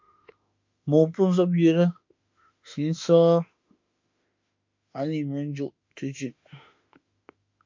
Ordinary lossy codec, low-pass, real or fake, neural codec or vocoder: MP3, 48 kbps; 7.2 kHz; fake; autoencoder, 48 kHz, 32 numbers a frame, DAC-VAE, trained on Japanese speech